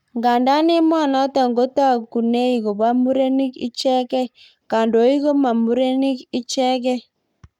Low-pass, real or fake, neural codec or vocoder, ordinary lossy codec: 19.8 kHz; fake; codec, 44.1 kHz, 7.8 kbps, Pupu-Codec; none